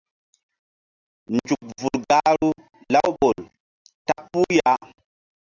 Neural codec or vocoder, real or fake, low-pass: none; real; 7.2 kHz